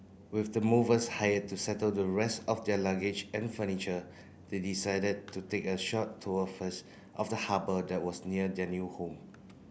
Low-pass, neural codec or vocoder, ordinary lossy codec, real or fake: none; none; none; real